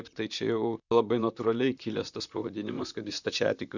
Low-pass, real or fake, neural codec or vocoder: 7.2 kHz; fake; vocoder, 44.1 kHz, 128 mel bands, Pupu-Vocoder